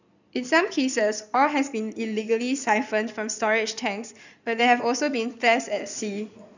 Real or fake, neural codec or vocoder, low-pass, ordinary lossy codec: fake; codec, 16 kHz in and 24 kHz out, 2.2 kbps, FireRedTTS-2 codec; 7.2 kHz; none